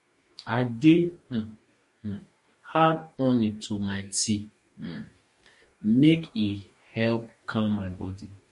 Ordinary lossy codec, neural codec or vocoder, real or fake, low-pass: MP3, 48 kbps; codec, 44.1 kHz, 2.6 kbps, DAC; fake; 14.4 kHz